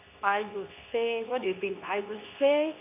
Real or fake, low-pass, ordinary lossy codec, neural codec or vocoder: fake; 3.6 kHz; none; codec, 24 kHz, 0.9 kbps, WavTokenizer, medium speech release version 2